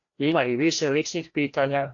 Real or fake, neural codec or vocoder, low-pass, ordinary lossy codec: fake; codec, 16 kHz, 1 kbps, FreqCodec, larger model; 7.2 kHz; AAC, 48 kbps